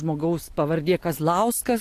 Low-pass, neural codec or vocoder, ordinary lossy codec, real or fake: 14.4 kHz; none; AAC, 64 kbps; real